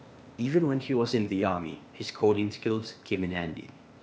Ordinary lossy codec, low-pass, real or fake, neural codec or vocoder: none; none; fake; codec, 16 kHz, 0.8 kbps, ZipCodec